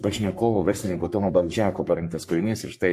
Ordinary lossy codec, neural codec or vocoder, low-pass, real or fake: MP3, 64 kbps; codec, 44.1 kHz, 3.4 kbps, Pupu-Codec; 14.4 kHz; fake